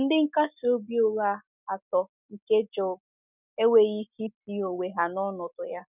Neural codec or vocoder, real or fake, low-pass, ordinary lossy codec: none; real; 3.6 kHz; none